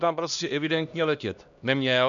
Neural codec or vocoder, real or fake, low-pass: codec, 16 kHz, 1 kbps, X-Codec, HuBERT features, trained on LibriSpeech; fake; 7.2 kHz